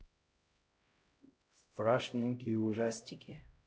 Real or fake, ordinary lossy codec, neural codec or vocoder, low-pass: fake; none; codec, 16 kHz, 0.5 kbps, X-Codec, HuBERT features, trained on LibriSpeech; none